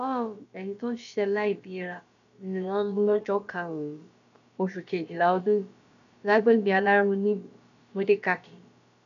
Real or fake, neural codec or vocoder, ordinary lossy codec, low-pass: fake; codec, 16 kHz, about 1 kbps, DyCAST, with the encoder's durations; none; 7.2 kHz